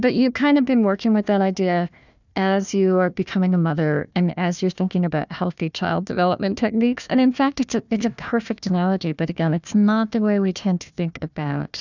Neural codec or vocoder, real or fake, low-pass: codec, 16 kHz, 1 kbps, FunCodec, trained on Chinese and English, 50 frames a second; fake; 7.2 kHz